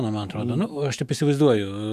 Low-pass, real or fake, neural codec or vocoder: 14.4 kHz; fake; autoencoder, 48 kHz, 128 numbers a frame, DAC-VAE, trained on Japanese speech